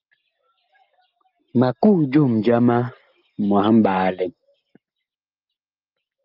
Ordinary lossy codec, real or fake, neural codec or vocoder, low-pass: Opus, 24 kbps; real; none; 5.4 kHz